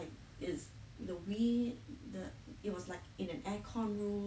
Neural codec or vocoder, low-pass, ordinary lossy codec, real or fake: none; none; none; real